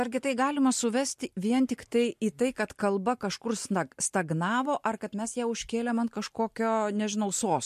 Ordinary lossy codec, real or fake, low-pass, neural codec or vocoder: MP3, 64 kbps; real; 14.4 kHz; none